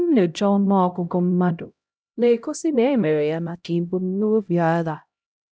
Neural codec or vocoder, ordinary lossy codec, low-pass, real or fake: codec, 16 kHz, 0.5 kbps, X-Codec, HuBERT features, trained on LibriSpeech; none; none; fake